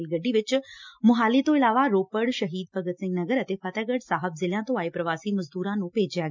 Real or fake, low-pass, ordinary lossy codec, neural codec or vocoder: real; 7.2 kHz; MP3, 64 kbps; none